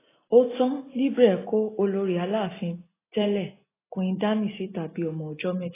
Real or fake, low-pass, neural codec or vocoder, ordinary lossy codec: real; 3.6 kHz; none; AAC, 16 kbps